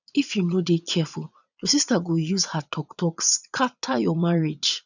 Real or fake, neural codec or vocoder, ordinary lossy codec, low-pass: fake; vocoder, 22.05 kHz, 80 mel bands, Vocos; none; 7.2 kHz